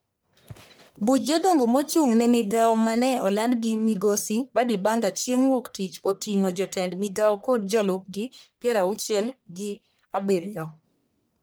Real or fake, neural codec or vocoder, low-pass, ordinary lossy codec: fake; codec, 44.1 kHz, 1.7 kbps, Pupu-Codec; none; none